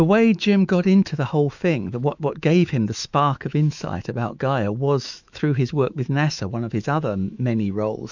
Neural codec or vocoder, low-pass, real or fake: autoencoder, 48 kHz, 128 numbers a frame, DAC-VAE, trained on Japanese speech; 7.2 kHz; fake